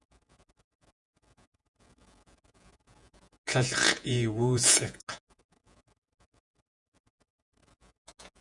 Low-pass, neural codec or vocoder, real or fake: 10.8 kHz; vocoder, 48 kHz, 128 mel bands, Vocos; fake